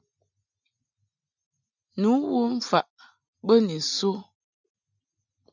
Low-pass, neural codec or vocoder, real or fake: 7.2 kHz; none; real